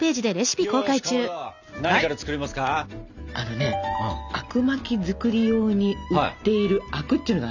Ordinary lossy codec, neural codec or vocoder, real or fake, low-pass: none; none; real; 7.2 kHz